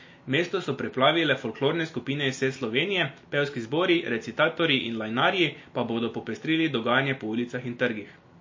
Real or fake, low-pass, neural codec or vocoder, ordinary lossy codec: real; 7.2 kHz; none; MP3, 32 kbps